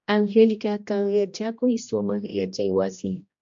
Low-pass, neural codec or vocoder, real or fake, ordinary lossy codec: 7.2 kHz; codec, 16 kHz, 1 kbps, X-Codec, HuBERT features, trained on general audio; fake; MP3, 48 kbps